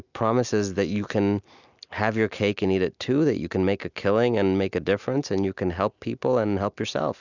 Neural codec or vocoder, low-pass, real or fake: none; 7.2 kHz; real